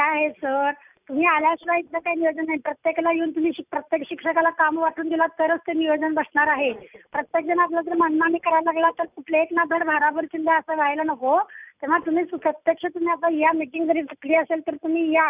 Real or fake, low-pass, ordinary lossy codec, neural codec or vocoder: real; 3.6 kHz; none; none